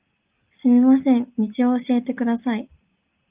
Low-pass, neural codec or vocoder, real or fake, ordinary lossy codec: 3.6 kHz; none; real; Opus, 32 kbps